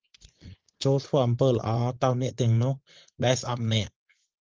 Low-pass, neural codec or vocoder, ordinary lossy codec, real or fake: 7.2 kHz; vocoder, 24 kHz, 100 mel bands, Vocos; Opus, 32 kbps; fake